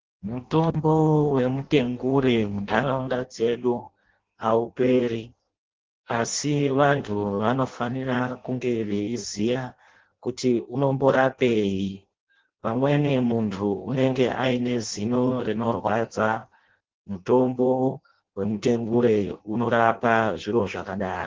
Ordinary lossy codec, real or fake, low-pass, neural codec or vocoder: Opus, 16 kbps; fake; 7.2 kHz; codec, 16 kHz in and 24 kHz out, 0.6 kbps, FireRedTTS-2 codec